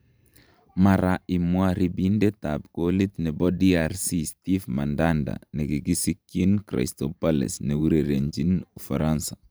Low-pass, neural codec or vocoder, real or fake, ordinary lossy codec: none; vocoder, 44.1 kHz, 128 mel bands every 512 samples, BigVGAN v2; fake; none